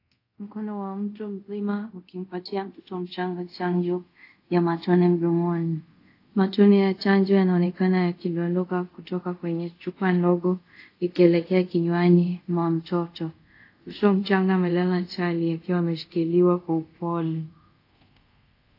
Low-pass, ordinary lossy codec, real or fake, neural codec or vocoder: 5.4 kHz; AAC, 32 kbps; fake; codec, 24 kHz, 0.5 kbps, DualCodec